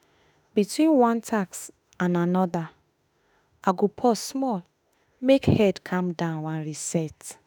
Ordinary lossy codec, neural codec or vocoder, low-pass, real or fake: none; autoencoder, 48 kHz, 32 numbers a frame, DAC-VAE, trained on Japanese speech; none; fake